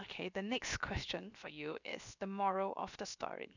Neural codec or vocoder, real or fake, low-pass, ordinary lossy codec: codec, 16 kHz, 0.7 kbps, FocalCodec; fake; 7.2 kHz; none